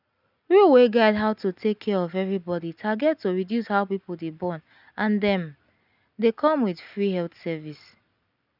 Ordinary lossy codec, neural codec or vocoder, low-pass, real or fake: none; none; 5.4 kHz; real